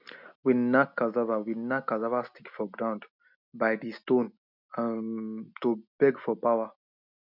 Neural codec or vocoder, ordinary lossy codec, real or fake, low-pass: none; none; real; 5.4 kHz